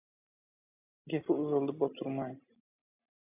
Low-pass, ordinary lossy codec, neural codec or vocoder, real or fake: 3.6 kHz; AAC, 24 kbps; none; real